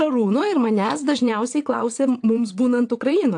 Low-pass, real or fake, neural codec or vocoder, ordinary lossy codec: 9.9 kHz; fake; vocoder, 22.05 kHz, 80 mel bands, WaveNeXt; AAC, 64 kbps